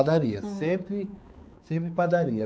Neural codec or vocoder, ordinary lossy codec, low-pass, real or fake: codec, 16 kHz, 4 kbps, X-Codec, HuBERT features, trained on balanced general audio; none; none; fake